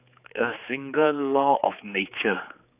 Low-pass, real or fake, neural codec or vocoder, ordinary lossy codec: 3.6 kHz; fake; codec, 16 kHz, 4 kbps, X-Codec, HuBERT features, trained on general audio; none